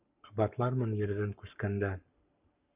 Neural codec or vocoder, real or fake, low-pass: codec, 44.1 kHz, 7.8 kbps, Pupu-Codec; fake; 3.6 kHz